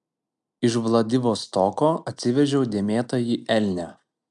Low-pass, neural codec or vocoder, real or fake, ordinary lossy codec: 10.8 kHz; none; real; MP3, 96 kbps